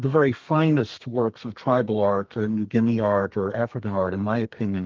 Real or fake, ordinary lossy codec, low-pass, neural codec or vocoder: fake; Opus, 32 kbps; 7.2 kHz; codec, 32 kHz, 1.9 kbps, SNAC